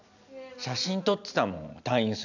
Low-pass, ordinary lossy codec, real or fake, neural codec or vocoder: 7.2 kHz; none; real; none